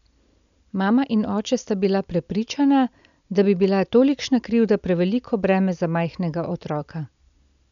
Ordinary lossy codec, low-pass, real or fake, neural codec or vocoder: none; 7.2 kHz; real; none